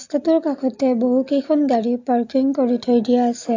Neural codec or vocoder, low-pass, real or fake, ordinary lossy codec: none; 7.2 kHz; real; AAC, 48 kbps